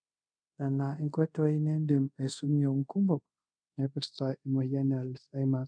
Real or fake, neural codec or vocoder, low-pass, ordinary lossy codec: fake; codec, 24 kHz, 0.5 kbps, DualCodec; 9.9 kHz; none